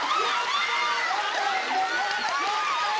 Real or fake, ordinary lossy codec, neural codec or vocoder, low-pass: real; none; none; none